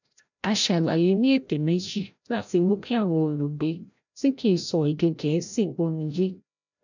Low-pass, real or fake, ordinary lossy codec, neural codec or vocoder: 7.2 kHz; fake; none; codec, 16 kHz, 0.5 kbps, FreqCodec, larger model